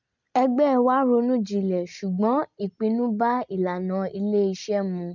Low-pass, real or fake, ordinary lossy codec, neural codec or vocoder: 7.2 kHz; real; none; none